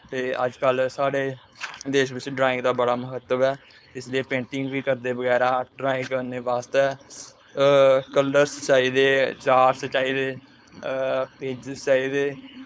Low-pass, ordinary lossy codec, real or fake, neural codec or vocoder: none; none; fake; codec, 16 kHz, 4.8 kbps, FACodec